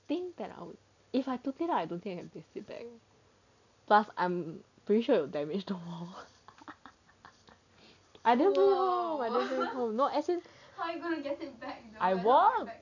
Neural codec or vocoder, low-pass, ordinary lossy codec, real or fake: none; 7.2 kHz; none; real